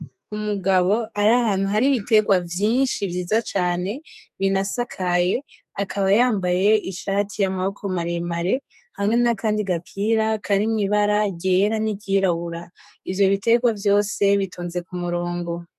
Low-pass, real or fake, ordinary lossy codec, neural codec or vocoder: 14.4 kHz; fake; MP3, 96 kbps; codec, 44.1 kHz, 2.6 kbps, SNAC